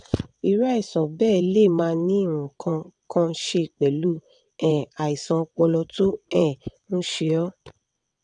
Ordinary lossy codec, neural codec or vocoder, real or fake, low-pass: none; vocoder, 22.05 kHz, 80 mel bands, WaveNeXt; fake; 9.9 kHz